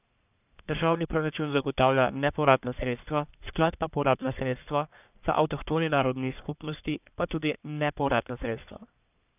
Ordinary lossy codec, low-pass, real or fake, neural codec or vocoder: none; 3.6 kHz; fake; codec, 44.1 kHz, 1.7 kbps, Pupu-Codec